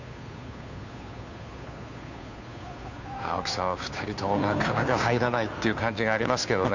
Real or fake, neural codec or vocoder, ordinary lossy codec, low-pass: fake; codec, 16 kHz, 2 kbps, FunCodec, trained on Chinese and English, 25 frames a second; none; 7.2 kHz